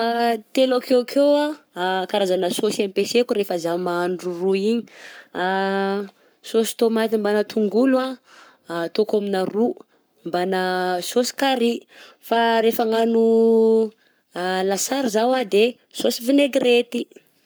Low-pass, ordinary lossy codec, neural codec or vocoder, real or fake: none; none; codec, 44.1 kHz, 7.8 kbps, Pupu-Codec; fake